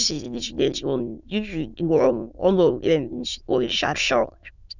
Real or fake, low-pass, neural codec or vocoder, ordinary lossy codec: fake; 7.2 kHz; autoencoder, 22.05 kHz, a latent of 192 numbers a frame, VITS, trained on many speakers; none